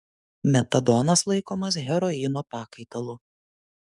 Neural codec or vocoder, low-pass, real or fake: codec, 44.1 kHz, 7.8 kbps, DAC; 10.8 kHz; fake